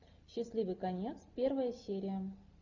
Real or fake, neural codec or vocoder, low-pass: real; none; 7.2 kHz